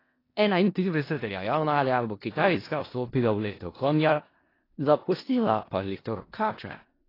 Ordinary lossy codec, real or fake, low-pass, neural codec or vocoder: AAC, 24 kbps; fake; 5.4 kHz; codec, 16 kHz in and 24 kHz out, 0.4 kbps, LongCat-Audio-Codec, four codebook decoder